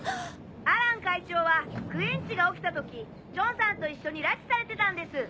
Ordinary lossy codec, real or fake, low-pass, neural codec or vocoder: none; real; none; none